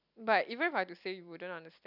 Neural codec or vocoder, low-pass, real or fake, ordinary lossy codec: none; 5.4 kHz; real; none